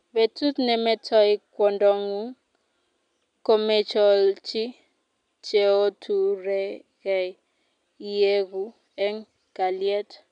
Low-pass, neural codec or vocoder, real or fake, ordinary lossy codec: 9.9 kHz; none; real; MP3, 96 kbps